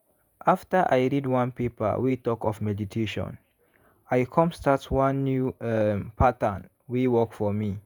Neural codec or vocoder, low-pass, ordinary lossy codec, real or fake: vocoder, 48 kHz, 128 mel bands, Vocos; none; none; fake